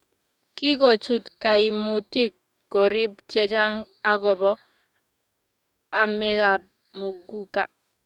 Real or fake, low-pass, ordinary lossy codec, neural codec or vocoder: fake; 19.8 kHz; none; codec, 44.1 kHz, 2.6 kbps, DAC